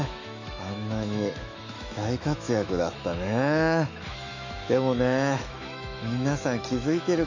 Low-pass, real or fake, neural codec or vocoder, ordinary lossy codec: 7.2 kHz; fake; autoencoder, 48 kHz, 128 numbers a frame, DAC-VAE, trained on Japanese speech; AAC, 48 kbps